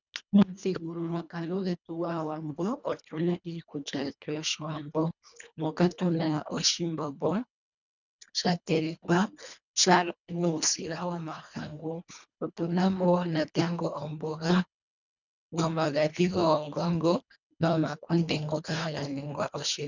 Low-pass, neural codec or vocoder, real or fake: 7.2 kHz; codec, 24 kHz, 1.5 kbps, HILCodec; fake